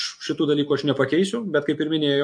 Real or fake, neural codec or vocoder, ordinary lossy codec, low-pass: real; none; MP3, 48 kbps; 9.9 kHz